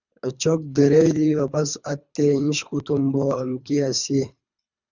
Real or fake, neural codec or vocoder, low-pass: fake; codec, 24 kHz, 3 kbps, HILCodec; 7.2 kHz